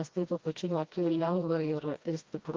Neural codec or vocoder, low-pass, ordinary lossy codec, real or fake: codec, 16 kHz, 1 kbps, FreqCodec, smaller model; 7.2 kHz; Opus, 32 kbps; fake